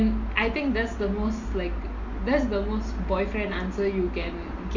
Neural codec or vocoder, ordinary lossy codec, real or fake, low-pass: none; MP3, 48 kbps; real; 7.2 kHz